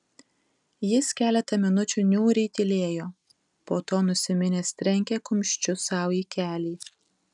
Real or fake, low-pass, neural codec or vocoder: real; 10.8 kHz; none